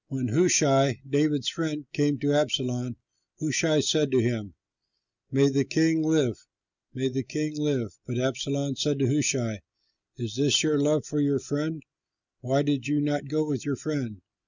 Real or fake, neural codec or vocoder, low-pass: real; none; 7.2 kHz